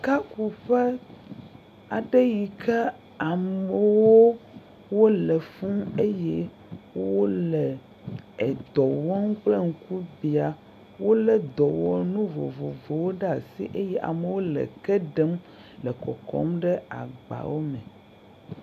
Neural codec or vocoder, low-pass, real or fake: none; 14.4 kHz; real